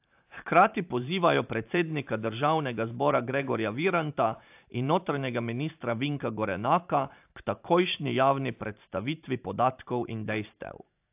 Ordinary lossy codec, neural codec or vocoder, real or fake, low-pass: AAC, 32 kbps; vocoder, 44.1 kHz, 128 mel bands every 512 samples, BigVGAN v2; fake; 3.6 kHz